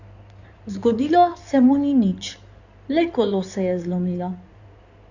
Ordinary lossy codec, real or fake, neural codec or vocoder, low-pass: none; fake; codec, 16 kHz in and 24 kHz out, 2.2 kbps, FireRedTTS-2 codec; 7.2 kHz